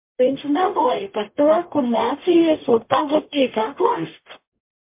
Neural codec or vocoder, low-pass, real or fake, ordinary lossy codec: codec, 44.1 kHz, 0.9 kbps, DAC; 3.6 kHz; fake; MP3, 32 kbps